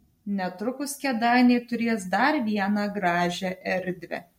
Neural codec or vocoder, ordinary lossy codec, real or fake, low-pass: none; MP3, 64 kbps; real; 19.8 kHz